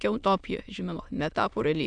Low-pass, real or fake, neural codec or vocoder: 9.9 kHz; fake; autoencoder, 22.05 kHz, a latent of 192 numbers a frame, VITS, trained on many speakers